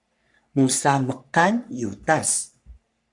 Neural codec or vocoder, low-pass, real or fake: codec, 44.1 kHz, 3.4 kbps, Pupu-Codec; 10.8 kHz; fake